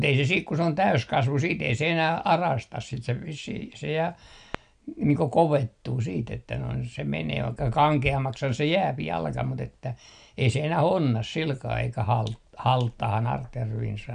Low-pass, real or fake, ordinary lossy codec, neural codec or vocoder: 9.9 kHz; real; none; none